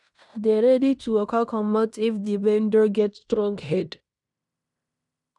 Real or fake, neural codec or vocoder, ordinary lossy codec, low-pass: fake; codec, 16 kHz in and 24 kHz out, 0.9 kbps, LongCat-Audio-Codec, fine tuned four codebook decoder; none; 10.8 kHz